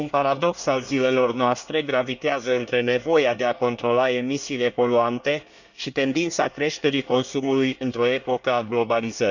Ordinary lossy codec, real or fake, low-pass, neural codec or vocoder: none; fake; 7.2 kHz; codec, 24 kHz, 1 kbps, SNAC